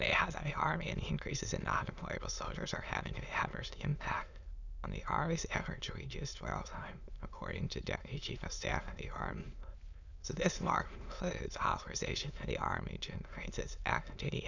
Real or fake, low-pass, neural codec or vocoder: fake; 7.2 kHz; autoencoder, 22.05 kHz, a latent of 192 numbers a frame, VITS, trained on many speakers